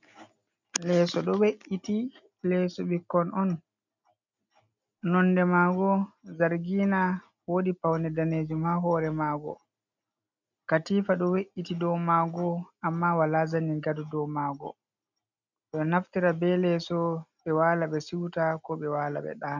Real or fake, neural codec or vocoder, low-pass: real; none; 7.2 kHz